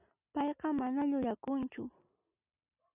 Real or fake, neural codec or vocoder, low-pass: real; none; 3.6 kHz